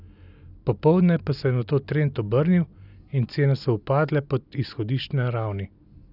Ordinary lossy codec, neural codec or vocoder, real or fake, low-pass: none; none; real; 5.4 kHz